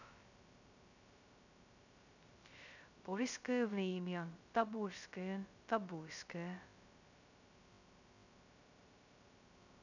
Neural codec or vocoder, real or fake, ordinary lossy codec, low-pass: codec, 16 kHz, 0.2 kbps, FocalCodec; fake; none; 7.2 kHz